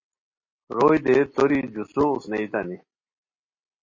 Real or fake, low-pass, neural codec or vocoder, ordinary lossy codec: real; 7.2 kHz; none; MP3, 32 kbps